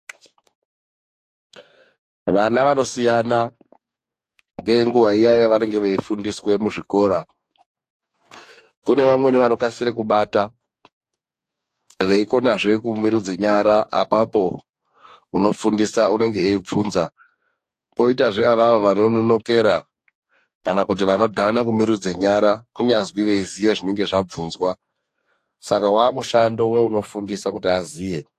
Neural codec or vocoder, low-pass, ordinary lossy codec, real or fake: codec, 44.1 kHz, 2.6 kbps, DAC; 14.4 kHz; AAC, 64 kbps; fake